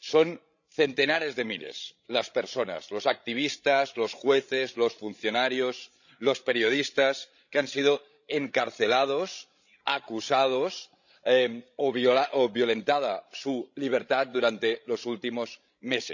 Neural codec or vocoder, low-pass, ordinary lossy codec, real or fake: codec, 16 kHz, 16 kbps, FreqCodec, larger model; 7.2 kHz; none; fake